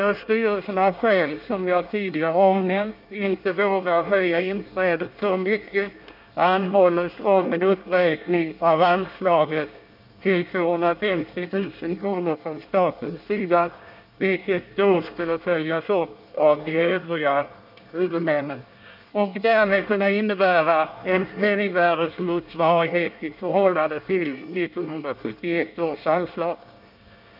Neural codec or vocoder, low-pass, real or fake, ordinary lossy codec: codec, 24 kHz, 1 kbps, SNAC; 5.4 kHz; fake; none